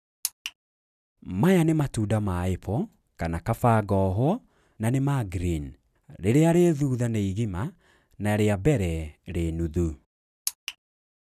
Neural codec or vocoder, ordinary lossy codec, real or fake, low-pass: none; none; real; 14.4 kHz